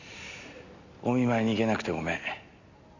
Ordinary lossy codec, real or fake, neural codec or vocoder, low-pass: none; real; none; 7.2 kHz